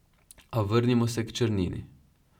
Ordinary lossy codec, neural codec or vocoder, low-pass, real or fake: none; none; 19.8 kHz; real